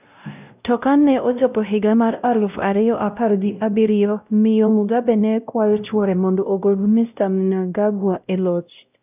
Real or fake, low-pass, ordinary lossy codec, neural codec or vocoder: fake; 3.6 kHz; none; codec, 16 kHz, 0.5 kbps, X-Codec, WavLM features, trained on Multilingual LibriSpeech